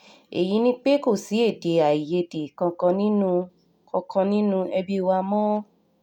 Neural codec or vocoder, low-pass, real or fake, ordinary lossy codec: none; 19.8 kHz; real; none